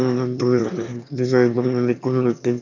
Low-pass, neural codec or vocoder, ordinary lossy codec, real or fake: 7.2 kHz; autoencoder, 22.05 kHz, a latent of 192 numbers a frame, VITS, trained on one speaker; none; fake